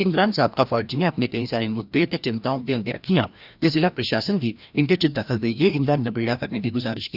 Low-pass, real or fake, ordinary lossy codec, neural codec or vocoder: 5.4 kHz; fake; none; codec, 16 kHz, 1 kbps, FreqCodec, larger model